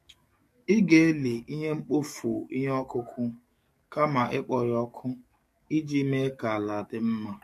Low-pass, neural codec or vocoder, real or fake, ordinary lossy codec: 14.4 kHz; codec, 44.1 kHz, 7.8 kbps, DAC; fake; MP3, 64 kbps